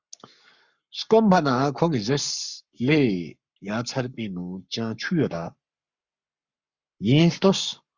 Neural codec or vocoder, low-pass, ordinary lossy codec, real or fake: codec, 44.1 kHz, 7.8 kbps, Pupu-Codec; 7.2 kHz; Opus, 64 kbps; fake